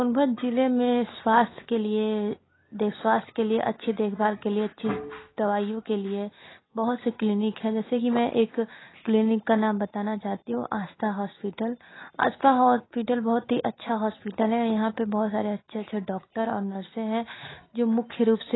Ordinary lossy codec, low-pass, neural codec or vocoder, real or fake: AAC, 16 kbps; 7.2 kHz; none; real